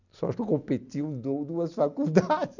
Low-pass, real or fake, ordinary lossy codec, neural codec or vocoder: 7.2 kHz; real; none; none